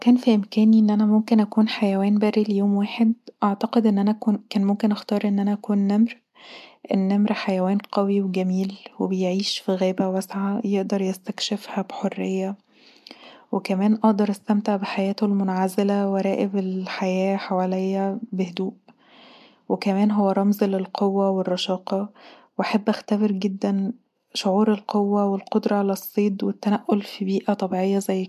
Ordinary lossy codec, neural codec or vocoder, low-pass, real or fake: MP3, 96 kbps; none; 14.4 kHz; real